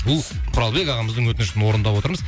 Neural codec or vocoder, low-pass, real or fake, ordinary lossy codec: none; none; real; none